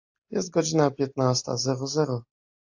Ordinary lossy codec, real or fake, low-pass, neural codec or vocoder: AAC, 48 kbps; real; 7.2 kHz; none